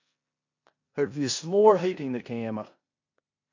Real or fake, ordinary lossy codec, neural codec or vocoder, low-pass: fake; AAC, 48 kbps; codec, 16 kHz in and 24 kHz out, 0.9 kbps, LongCat-Audio-Codec, four codebook decoder; 7.2 kHz